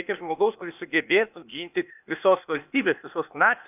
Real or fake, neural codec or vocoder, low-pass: fake; codec, 16 kHz, 0.8 kbps, ZipCodec; 3.6 kHz